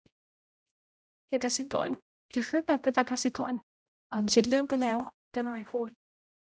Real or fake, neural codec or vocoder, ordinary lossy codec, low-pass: fake; codec, 16 kHz, 0.5 kbps, X-Codec, HuBERT features, trained on general audio; none; none